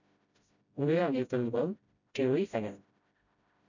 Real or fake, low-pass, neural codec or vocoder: fake; 7.2 kHz; codec, 16 kHz, 0.5 kbps, FreqCodec, smaller model